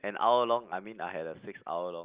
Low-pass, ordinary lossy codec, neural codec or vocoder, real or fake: 3.6 kHz; Opus, 24 kbps; none; real